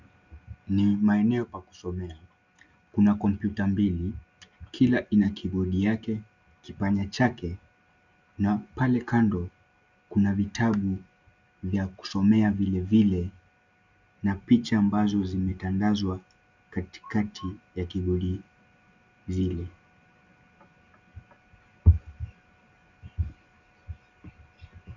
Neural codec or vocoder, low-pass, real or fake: none; 7.2 kHz; real